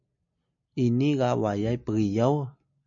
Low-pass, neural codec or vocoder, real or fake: 7.2 kHz; none; real